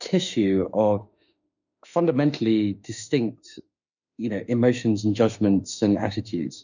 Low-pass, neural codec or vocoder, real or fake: 7.2 kHz; autoencoder, 48 kHz, 32 numbers a frame, DAC-VAE, trained on Japanese speech; fake